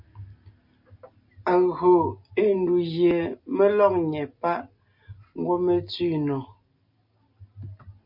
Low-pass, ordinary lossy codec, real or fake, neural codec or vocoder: 5.4 kHz; MP3, 48 kbps; real; none